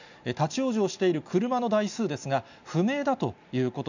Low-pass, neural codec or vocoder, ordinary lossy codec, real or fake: 7.2 kHz; none; none; real